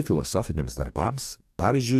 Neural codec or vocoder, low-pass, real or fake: codec, 44.1 kHz, 2.6 kbps, DAC; 14.4 kHz; fake